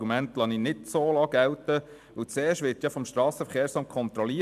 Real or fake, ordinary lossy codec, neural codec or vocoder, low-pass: fake; AAC, 96 kbps; vocoder, 44.1 kHz, 128 mel bands every 256 samples, BigVGAN v2; 14.4 kHz